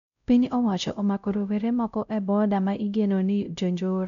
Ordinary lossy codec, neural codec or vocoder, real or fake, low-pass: none; codec, 16 kHz, 0.5 kbps, X-Codec, WavLM features, trained on Multilingual LibriSpeech; fake; 7.2 kHz